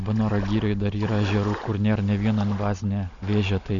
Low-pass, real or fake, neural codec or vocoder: 7.2 kHz; real; none